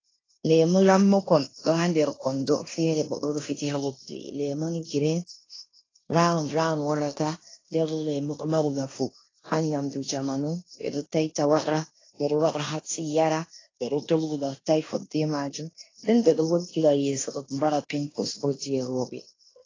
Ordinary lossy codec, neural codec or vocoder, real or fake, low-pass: AAC, 32 kbps; codec, 16 kHz in and 24 kHz out, 0.9 kbps, LongCat-Audio-Codec, fine tuned four codebook decoder; fake; 7.2 kHz